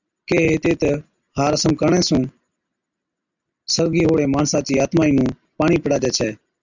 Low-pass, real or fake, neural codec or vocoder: 7.2 kHz; real; none